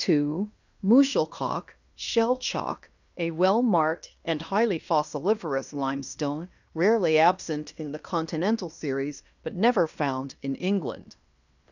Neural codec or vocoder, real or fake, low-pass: codec, 16 kHz in and 24 kHz out, 0.9 kbps, LongCat-Audio-Codec, fine tuned four codebook decoder; fake; 7.2 kHz